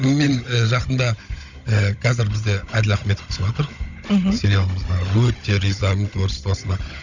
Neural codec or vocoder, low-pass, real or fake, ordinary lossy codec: codec, 16 kHz, 16 kbps, FunCodec, trained on Chinese and English, 50 frames a second; 7.2 kHz; fake; none